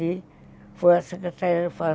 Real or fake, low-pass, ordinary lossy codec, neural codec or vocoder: real; none; none; none